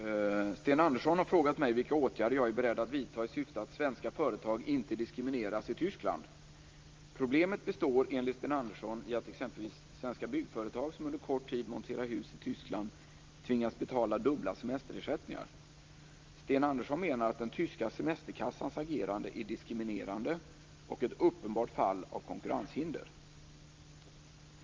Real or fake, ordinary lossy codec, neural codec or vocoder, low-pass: real; Opus, 32 kbps; none; 7.2 kHz